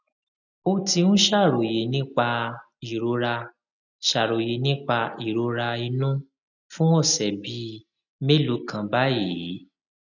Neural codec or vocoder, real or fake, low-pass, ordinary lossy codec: none; real; 7.2 kHz; none